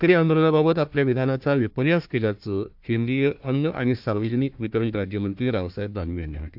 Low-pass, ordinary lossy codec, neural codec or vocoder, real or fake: 5.4 kHz; AAC, 48 kbps; codec, 16 kHz, 1 kbps, FunCodec, trained on Chinese and English, 50 frames a second; fake